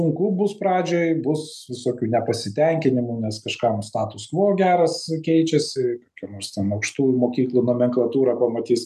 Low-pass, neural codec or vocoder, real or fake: 14.4 kHz; none; real